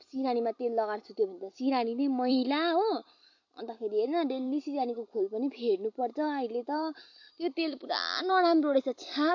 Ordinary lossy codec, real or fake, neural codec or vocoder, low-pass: MP3, 48 kbps; fake; vocoder, 44.1 kHz, 128 mel bands every 256 samples, BigVGAN v2; 7.2 kHz